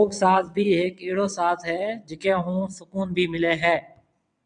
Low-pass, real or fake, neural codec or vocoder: 9.9 kHz; fake; vocoder, 22.05 kHz, 80 mel bands, WaveNeXt